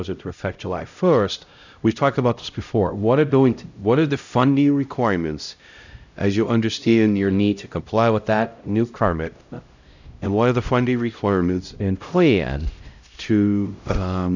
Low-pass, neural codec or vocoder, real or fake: 7.2 kHz; codec, 16 kHz, 0.5 kbps, X-Codec, HuBERT features, trained on LibriSpeech; fake